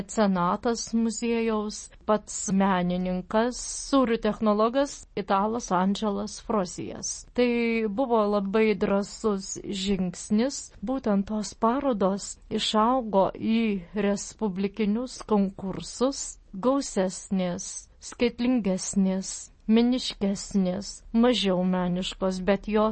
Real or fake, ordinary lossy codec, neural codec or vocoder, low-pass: real; MP3, 32 kbps; none; 9.9 kHz